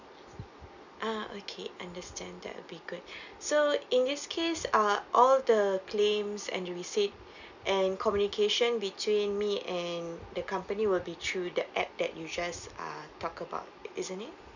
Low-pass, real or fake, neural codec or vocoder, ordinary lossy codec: 7.2 kHz; real; none; none